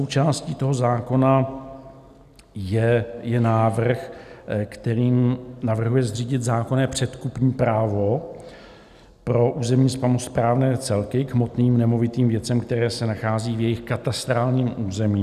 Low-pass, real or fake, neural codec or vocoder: 14.4 kHz; real; none